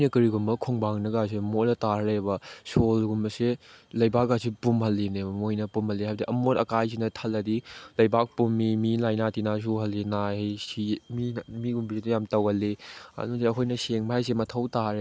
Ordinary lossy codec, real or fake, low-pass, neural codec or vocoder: none; real; none; none